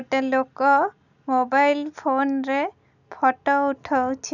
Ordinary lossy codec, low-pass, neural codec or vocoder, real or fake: none; 7.2 kHz; none; real